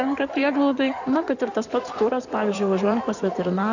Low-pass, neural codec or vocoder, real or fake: 7.2 kHz; codec, 16 kHz in and 24 kHz out, 2.2 kbps, FireRedTTS-2 codec; fake